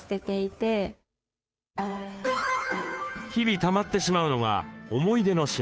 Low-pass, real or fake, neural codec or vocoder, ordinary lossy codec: none; fake; codec, 16 kHz, 2 kbps, FunCodec, trained on Chinese and English, 25 frames a second; none